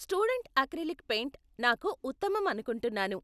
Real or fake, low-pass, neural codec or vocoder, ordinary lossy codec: real; 14.4 kHz; none; none